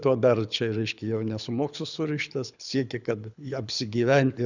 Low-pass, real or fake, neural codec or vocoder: 7.2 kHz; fake; codec, 24 kHz, 6 kbps, HILCodec